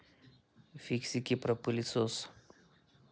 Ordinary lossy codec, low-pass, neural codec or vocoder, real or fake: none; none; none; real